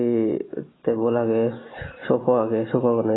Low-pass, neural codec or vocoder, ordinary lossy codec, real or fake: 7.2 kHz; none; AAC, 16 kbps; real